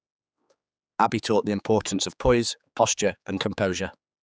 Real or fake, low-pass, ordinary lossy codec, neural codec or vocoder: fake; none; none; codec, 16 kHz, 4 kbps, X-Codec, HuBERT features, trained on general audio